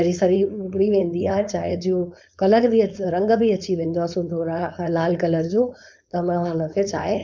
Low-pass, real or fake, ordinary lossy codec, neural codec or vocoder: none; fake; none; codec, 16 kHz, 4.8 kbps, FACodec